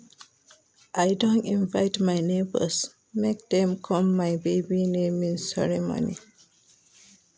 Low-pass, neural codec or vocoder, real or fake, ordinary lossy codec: none; none; real; none